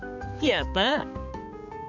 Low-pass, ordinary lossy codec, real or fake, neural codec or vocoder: 7.2 kHz; none; fake; codec, 16 kHz, 4 kbps, X-Codec, HuBERT features, trained on balanced general audio